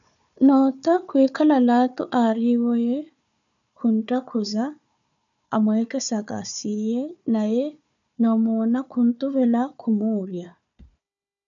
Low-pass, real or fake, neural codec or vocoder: 7.2 kHz; fake; codec, 16 kHz, 4 kbps, FunCodec, trained on Chinese and English, 50 frames a second